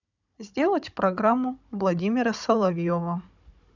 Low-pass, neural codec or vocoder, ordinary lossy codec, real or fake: 7.2 kHz; codec, 16 kHz, 16 kbps, FunCodec, trained on Chinese and English, 50 frames a second; none; fake